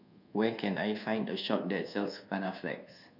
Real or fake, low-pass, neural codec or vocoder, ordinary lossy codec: fake; 5.4 kHz; codec, 24 kHz, 1.2 kbps, DualCodec; none